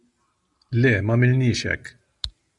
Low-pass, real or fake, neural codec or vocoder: 10.8 kHz; real; none